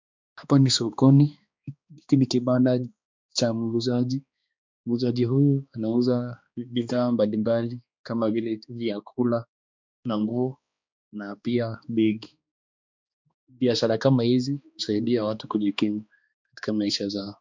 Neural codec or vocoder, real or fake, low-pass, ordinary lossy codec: codec, 16 kHz, 2 kbps, X-Codec, HuBERT features, trained on balanced general audio; fake; 7.2 kHz; MP3, 64 kbps